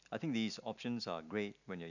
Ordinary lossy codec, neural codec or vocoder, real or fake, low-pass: none; none; real; 7.2 kHz